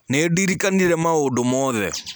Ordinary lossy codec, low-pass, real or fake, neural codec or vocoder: none; none; real; none